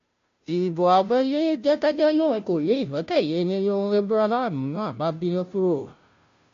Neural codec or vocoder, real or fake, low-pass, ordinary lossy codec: codec, 16 kHz, 0.5 kbps, FunCodec, trained on Chinese and English, 25 frames a second; fake; 7.2 kHz; MP3, 48 kbps